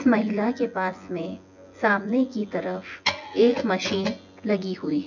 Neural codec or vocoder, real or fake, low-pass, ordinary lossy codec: vocoder, 24 kHz, 100 mel bands, Vocos; fake; 7.2 kHz; none